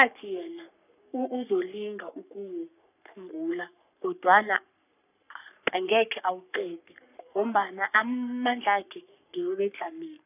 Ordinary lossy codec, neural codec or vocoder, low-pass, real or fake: none; codec, 44.1 kHz, 3.4 kbps, Pupu-Codec; 3.6 kHz; fake